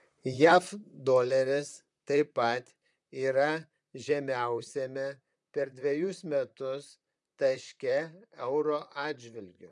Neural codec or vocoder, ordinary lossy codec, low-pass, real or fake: vocoder, 44.1 kHz, 128 mel bands, Pupu-Vocoder; MP3, 96 kbps; 10.8 kHz; fake